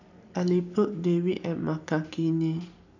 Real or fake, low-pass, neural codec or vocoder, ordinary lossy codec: real; 7.2 kHz; none; none